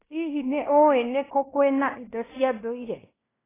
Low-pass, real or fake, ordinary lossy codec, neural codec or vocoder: 3.6 kHz; fake; AAC, 16 kbps; codec, 16 kHz in and 24 kHz out, 0.9 kbps, LongCat-Audio-Codec, fine tuned four codebook decoder